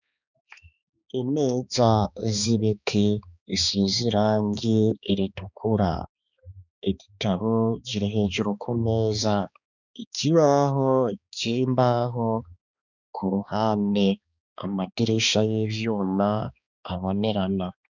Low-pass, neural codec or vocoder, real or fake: 7.2 kHz; codec, 16 kHz, 2 kbps, X-Codec, HuBERT features, trained on balanced general audio; fake